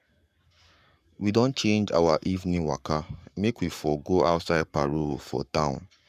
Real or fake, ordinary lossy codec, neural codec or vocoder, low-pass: fake; none; codec, 44.1 kHz, 7.8 kbps, Pupu-Codec; 14.4 kHz